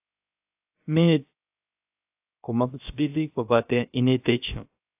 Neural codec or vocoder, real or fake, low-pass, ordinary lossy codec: codec, 16 kHz, 0.3 kbps, FocalCodec; fake; 3.6 kHz; AAC, 32 kbps